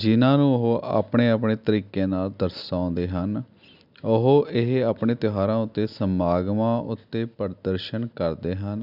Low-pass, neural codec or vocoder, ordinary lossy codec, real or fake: 5.4 kHz; none; none; real